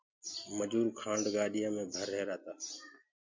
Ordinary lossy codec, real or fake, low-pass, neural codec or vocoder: MP3, 48 kbps; real; 7.2 kHz; none